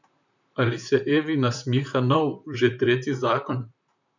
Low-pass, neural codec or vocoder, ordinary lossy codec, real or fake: 7.2 kHz; vocoder, 44.1 kHz, 128 mel bands, Pupu-Vocoder; none; fake